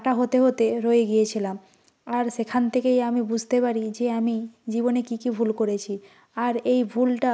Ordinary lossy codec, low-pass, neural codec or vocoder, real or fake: none; none; none; real